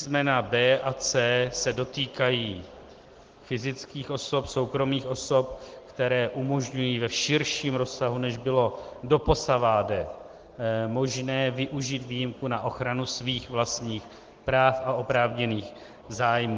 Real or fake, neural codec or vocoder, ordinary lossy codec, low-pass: real; none; Opus, 16 kbps; 7.2 kHz